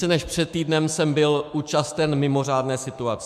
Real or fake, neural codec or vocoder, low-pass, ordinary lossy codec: fake; autoencoder, 48 kHz, 128 numbers a frame, DAC-VAE, trained on Japanese speech; 14.4 kHz; MP3, 96 kbps